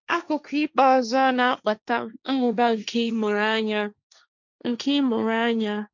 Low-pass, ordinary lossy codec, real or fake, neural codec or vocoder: 7.2 kHz; none; fake; codec, 16 kHz, 1.1 kbps, Voila-Tokenizer